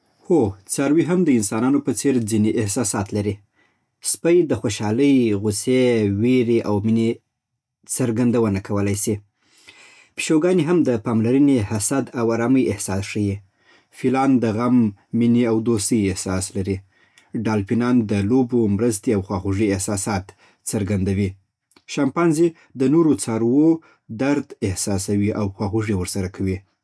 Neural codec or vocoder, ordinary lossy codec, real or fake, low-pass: none; none; real; none